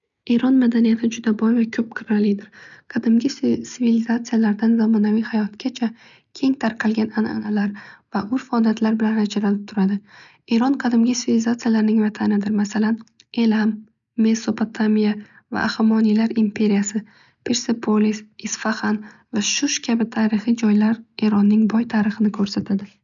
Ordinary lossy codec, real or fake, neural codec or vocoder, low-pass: none; real; none; 7.2 kHz